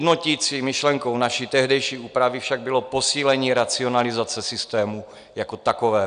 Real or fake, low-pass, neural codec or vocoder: real; 9.9 kHz; none